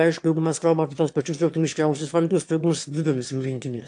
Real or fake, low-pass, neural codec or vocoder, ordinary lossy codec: fake; 9.9 kHz; autoencoder, 22.05 kHz, a latent of 192 numbers a frame, VITS, trained on one speaker; AAC, 64 kbps